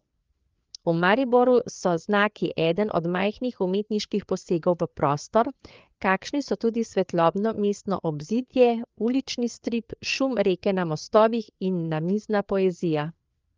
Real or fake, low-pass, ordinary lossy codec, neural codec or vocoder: fake; 7.2 kHz; Opus, 32 kbps; codec, 16 kHz, 4 kbps, FreqCodec, larger model